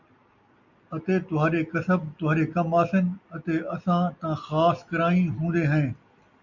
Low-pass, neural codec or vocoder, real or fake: 7.2 kHz; none; real